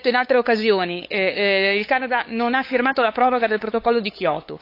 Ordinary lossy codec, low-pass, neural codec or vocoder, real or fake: AAC, 32 kbps; 5.4 kHz; codec, 16 kHz, 8 kbps, FunCodec, trained on LibriTTS, 25 frames a second; fake